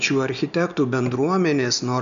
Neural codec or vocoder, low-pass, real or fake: none; 7.2 kHz; real